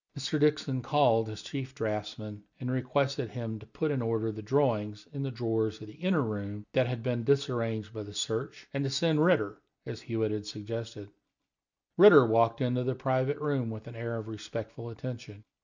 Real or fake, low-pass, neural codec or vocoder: real; 7.2 kHz; none